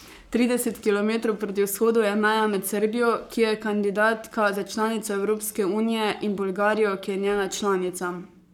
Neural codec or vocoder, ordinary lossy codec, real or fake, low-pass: codec, 44.1 kHz, 7.8 kbps, Pupu-Codec; none; fake; 19.8 kHz